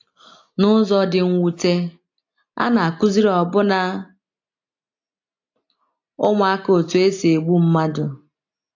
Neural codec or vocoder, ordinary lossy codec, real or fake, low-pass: none; AAC, 48 kbps; real; 7.2 kHz